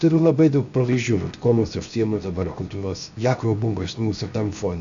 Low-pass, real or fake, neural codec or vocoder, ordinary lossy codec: 7.2 kHz; fake; codec, 16 kHz, 0.7 kbps, FocalCodec; AAC, 64 kbps